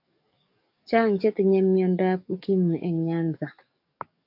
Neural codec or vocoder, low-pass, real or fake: codec, 44.1 kHz, 7.8 kbps, DAC; 5.4 kHz; fake